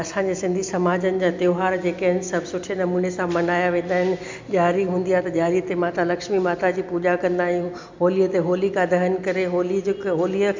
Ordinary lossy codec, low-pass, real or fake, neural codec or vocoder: MP3, 64 kbps; 7.2 kHz; real; none